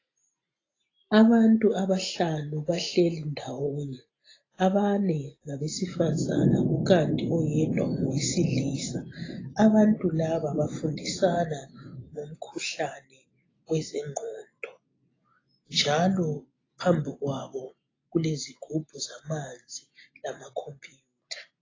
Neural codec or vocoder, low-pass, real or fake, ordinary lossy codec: none; 7.2 kHz; real; AAC, 32 kbps